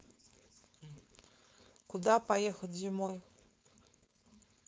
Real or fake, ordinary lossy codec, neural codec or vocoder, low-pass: fake; none; codec, 16 kHz, 4.8 kbps, FACodec; none